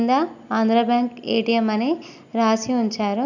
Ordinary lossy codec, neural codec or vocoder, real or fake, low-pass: none; none; real; 7.2 kHz